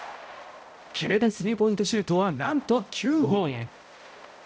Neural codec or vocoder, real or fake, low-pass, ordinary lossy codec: codec, 16 kHz, 0.5 kbps, X-Codec, HuBERT features, trained on balanced general audio; fake; none; none